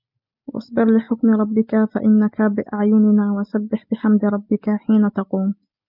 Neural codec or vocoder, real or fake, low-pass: none; real; 5.4 kHz